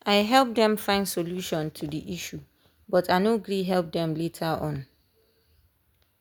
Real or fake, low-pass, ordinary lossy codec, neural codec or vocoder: real; none; none; none